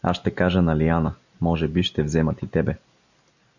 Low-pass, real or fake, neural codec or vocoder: 7.2 kHz; real; none